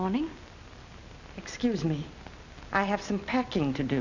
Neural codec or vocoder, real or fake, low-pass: none; real; 7.2 kHz